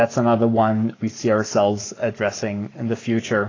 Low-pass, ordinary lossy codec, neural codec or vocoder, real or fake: 7.2 kHz; AAC, 32 kbps; codec, 44.1 kHz, 7.8 kbps, Pupu-Codec; fake